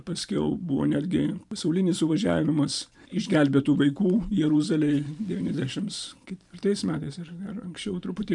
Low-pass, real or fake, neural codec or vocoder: 10.8 kHz; fake; vocoder, 44.1 kHz, 128 mel bands every 512 samples, BigVGAN v2